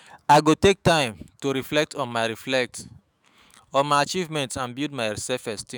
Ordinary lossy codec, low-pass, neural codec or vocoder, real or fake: none; none; autoencoder, 48 kHz, 128 numbers a frame, DAC-VAE, trained on Japanese speech; fake